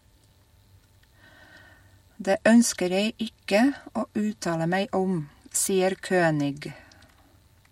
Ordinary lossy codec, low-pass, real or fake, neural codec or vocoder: MP3, 64 kbps; 19.8 kHz; real; none